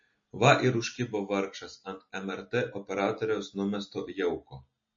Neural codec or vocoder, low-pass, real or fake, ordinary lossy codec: none; 7.2 kHz; real; MP3, 32 kbps